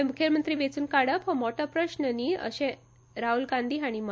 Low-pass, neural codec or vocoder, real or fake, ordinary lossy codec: none; none; real; none